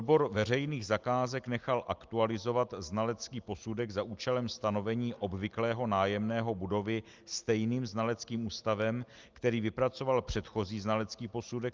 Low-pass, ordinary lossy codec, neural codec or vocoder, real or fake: 7.2 kHz; Opus, 32 kbps; none; real